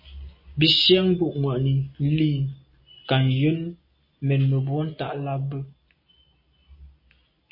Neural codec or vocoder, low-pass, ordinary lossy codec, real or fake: none; 5.4 kHz; MP3, 24 kbps; real